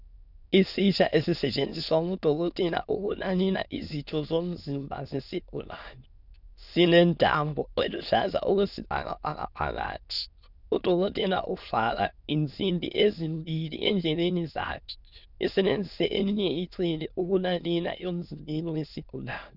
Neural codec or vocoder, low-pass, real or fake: autoencoder, 22.05 kHz, a latent of 192 numbers a frame, VITS, trained on many speakers; 5.4 kHz; fake